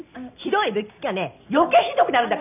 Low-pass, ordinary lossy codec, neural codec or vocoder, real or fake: 3.6 kHz; none; none; real